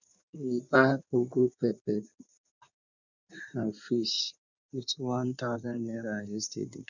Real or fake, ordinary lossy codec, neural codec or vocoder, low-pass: fake; none; codec, 16 kHz in and 24 kHz out, 1.1 kbps, FireRedTTS-2 codec; 7.2 kHz